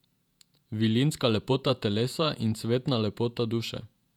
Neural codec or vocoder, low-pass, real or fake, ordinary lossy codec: vocoder, 48 kHz, 128 mel bands, Vocos; 19.8 kHz; fake; none